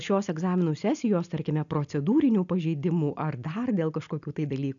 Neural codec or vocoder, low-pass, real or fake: none; 7.2 kHz; real